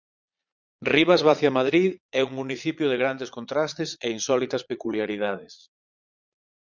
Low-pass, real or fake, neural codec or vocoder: 7.2 kHz; fake; vocoder, 22.05 kHz, 80 mel bands, Vocos